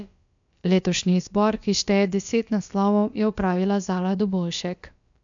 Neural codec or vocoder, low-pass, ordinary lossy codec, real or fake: codec, 16 kHz, about 1 kbps, DyCAST, with the encoder's durations; 7.2 kHz; MP3, 64 kbps; fake